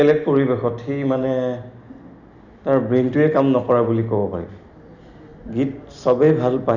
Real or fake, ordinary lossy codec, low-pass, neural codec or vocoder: real; none; 7.2 kHz; none